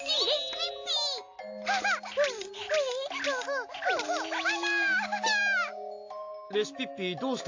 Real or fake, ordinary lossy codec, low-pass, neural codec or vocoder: real; AAC, 48 kbps; 7.2 kHz; none